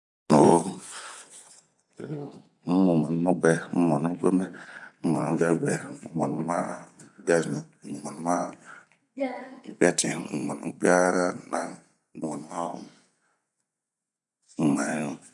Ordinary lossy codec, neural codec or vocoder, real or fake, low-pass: none; vocoder, 24 kHz, 100 mel bands, Vocos; fake; 10.8 kHz